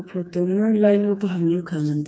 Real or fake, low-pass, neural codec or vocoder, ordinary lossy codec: fake; none; codec, 16 kHz, 2 kbps, FreqCodec, smaller model; none